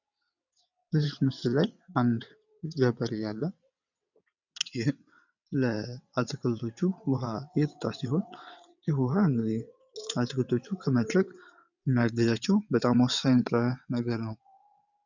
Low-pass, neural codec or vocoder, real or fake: 7.2 kHz; vocoder, 22.05 kHz, 80 mel bands, WaveNeXt; fake